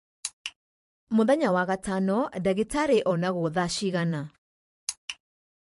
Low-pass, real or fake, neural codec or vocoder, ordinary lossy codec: 14.4 kHz; fake; vocoder, 44.1 kHz, 128 mel bands every 512 samples, BigVGAN v2; MP3, 48 kbps